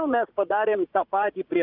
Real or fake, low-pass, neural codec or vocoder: fake; 5.4 kHz; vocoder, 44.1 kHz, 80 mel bands, Vocos